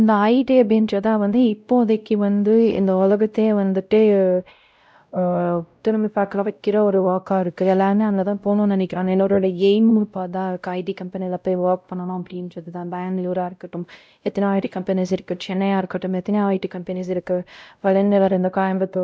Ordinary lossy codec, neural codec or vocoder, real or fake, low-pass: none; codec, 16 kHz, 0.5 kbps, X-Codec, WavLM features, trained on Multilingual LibriSpeech; fake; none